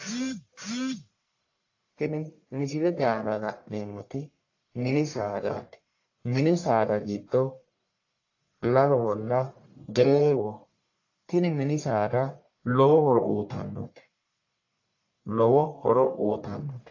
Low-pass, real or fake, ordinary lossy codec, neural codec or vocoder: 7.2 kHz; fake; AAC, 48 kbps; codec, 44.1 kHz, 1.7 kbps, Pupu-Codec